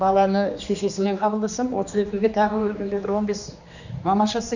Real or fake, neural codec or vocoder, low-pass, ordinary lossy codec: fake; codec, 16 kHz, 2 kbps, X-Codec, HuBERT features, trained on general audio; 7.2 kHz; none